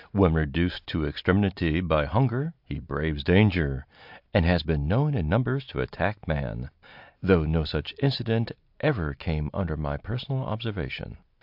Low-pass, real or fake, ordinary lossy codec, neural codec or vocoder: 5.4 kHz; real; AAC, 48 kbps; none